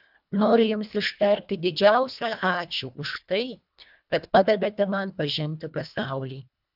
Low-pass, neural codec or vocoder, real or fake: 5.4 kHz; codec, 24 kHz, 1.5 kbps, HILCodec; fake